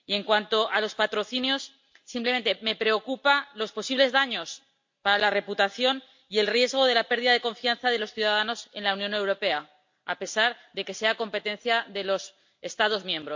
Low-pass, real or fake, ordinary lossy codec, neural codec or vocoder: 7.2 kHz; real; MP3, 48 kbps; none